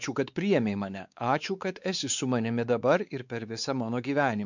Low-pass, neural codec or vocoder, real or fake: 7.2 kHz; codec, 16 kHz, 4 kbps, X-Codec, WavLM features, trained on Multilingual LibriSpeech; fake